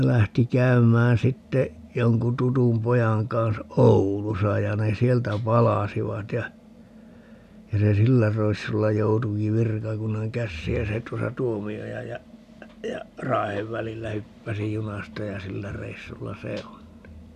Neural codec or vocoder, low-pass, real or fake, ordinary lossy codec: none; 14.4 kHz; real; none